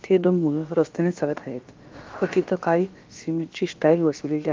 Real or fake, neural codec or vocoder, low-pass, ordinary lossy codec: fake; codec, 16 kHz, about 1 kbps, DyCAST, with the encoder's durations; 7.2 kHz; Opus, 32 kbps